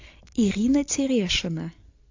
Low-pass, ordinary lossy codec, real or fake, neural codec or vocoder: 7.2 kHz; AAC, 48 kbps; real; none